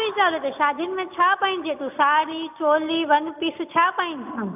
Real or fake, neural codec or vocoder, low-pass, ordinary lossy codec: real; none; 3.6 kHz; AAC, 32 kbps